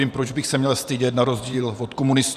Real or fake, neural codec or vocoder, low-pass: fake; vocoder, 44.1 kHz, 128 mel bands every 512 samples, BigVGAN v2; 14.4 kHz